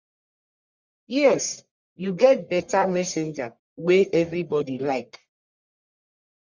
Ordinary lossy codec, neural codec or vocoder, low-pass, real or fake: Opus, 64 kbps; codec, 44.1 kHz, 1.7 kbps, Pupu-Codec; 7.2 kHz; fake